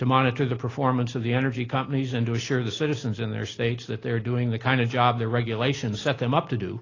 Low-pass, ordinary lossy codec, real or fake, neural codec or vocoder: 7.2 kHz; AAC, 32 kbps; real; none